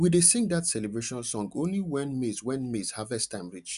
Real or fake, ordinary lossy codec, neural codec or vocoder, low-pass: real; none; none; 10.8 kHz